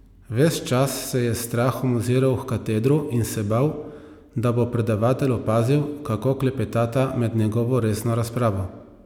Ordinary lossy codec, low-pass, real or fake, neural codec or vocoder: none; 19.8 kHz; real; none